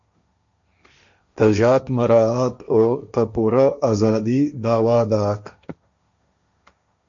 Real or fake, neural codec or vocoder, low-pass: fake; codec, 16 kHz, 1.1 kbps, Voila-Tokenizer; 7.2 kHz